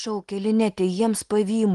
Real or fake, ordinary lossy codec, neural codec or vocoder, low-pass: real; Opus, 24 kbps; none; 10.8 kHz